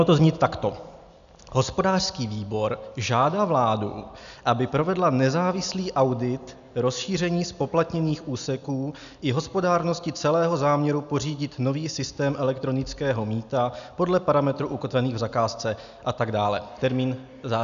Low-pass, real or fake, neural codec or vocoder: 7.2 kHz; real; none